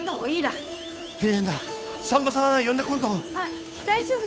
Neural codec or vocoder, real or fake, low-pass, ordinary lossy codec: codec, 16 kHz, 2 kbps, FunCodec, trained on Chinese and English, 25 frames a second; fake; none; none